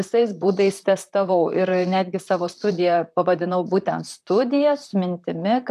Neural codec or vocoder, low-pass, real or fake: vocoder, 44.1 kHz, 128 mel bands, Pupu-Vocoder; 14.4 kHz; fake